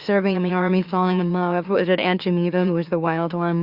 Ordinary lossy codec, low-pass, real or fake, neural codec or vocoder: Opus, 64 kbps; 5.4 kHz; fake; autoencoder, 44.1 kHz, a latent of 192 numbers a frame, MeloTTS